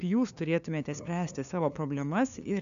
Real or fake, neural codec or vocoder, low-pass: fake; codec, 16 kHz, 2 kbps, FunCodec, trained on LibriTTS, 25 frames a second; 7.2 kHz